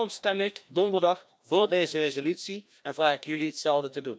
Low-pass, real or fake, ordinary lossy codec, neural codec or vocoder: none; fake; none; codec, 16 kHz, 1 kbps, FreqCodec, larger model